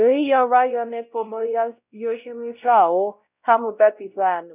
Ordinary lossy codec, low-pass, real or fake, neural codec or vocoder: none; 3.6 kHz; fake; codec, 16 kHz, 0.5 kbps, X-Codec, WavLM features, trained on Multilingual LibriSpeech